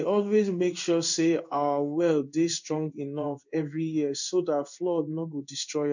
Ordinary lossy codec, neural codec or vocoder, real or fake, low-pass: none; codec, 16 kHz in and 24 kHz out, 1 kbps, XY-Tokenizer; fake; 7.2 kHz